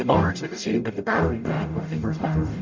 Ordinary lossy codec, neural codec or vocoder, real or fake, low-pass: MP3, 64 kbps; codec, 44.1 kHz, 0.9 kbps, DAC; fake; 7.2 kHz